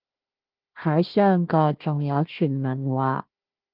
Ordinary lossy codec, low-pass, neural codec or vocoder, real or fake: Opus, 32 kbps; 5.4 kHz; codec, 16 kHz, 1 kbps, FunCodec, trained on Chinese and English, 50 frames a second; fake